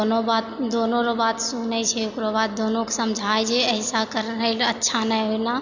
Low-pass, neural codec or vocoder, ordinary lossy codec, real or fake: 7.2 kHz; none; none; real